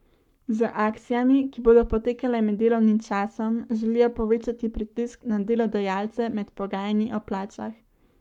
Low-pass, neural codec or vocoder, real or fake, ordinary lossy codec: 19.8 kHz; codec, 44.1 kHz, 7.8 kbps, Pupu-Codec; fake; none